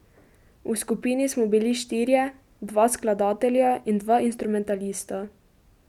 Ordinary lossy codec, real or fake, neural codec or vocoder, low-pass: none; real; none; 19.8 kHz